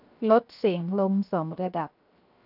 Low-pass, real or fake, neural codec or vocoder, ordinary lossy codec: 5.4 kHz; fake; codec, 16 kHz, 0.7 kbps, FocalCodec; none